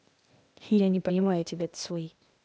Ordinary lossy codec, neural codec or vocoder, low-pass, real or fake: none; codec, 16 kHz, 0.8 kbps, ZipCodec; none; fake